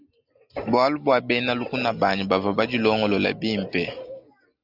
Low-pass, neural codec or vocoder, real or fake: 5.4 kHz; none; real